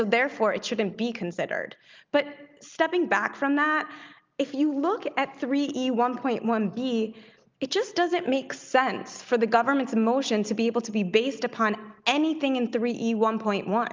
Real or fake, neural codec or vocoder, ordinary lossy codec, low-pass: real; none; Opus, 24 kbps; 7.2 kHz